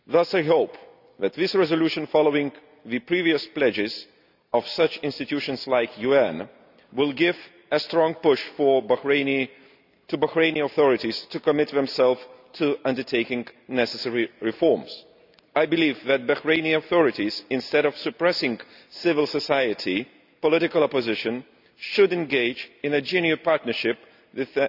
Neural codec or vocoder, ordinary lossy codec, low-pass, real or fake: none; none; 5.4 kHz; real